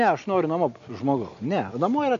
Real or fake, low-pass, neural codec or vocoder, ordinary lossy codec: real; 7.2 kHz; none; MP3, 48 kbps